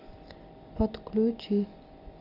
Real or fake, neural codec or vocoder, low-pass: real; none; 5.4 kHz